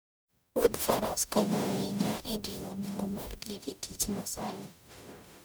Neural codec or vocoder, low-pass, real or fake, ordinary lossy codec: codec, 44.1 kHz, 0.9 kbps, DAC; none; fake; none